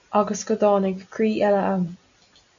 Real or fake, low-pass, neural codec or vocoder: real; 7.2 kHz; none